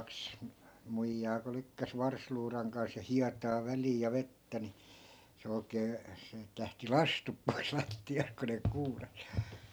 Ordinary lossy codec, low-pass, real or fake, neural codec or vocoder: none; none; real; none